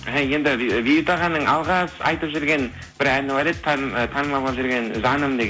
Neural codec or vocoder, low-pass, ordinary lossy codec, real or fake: none; none; none; real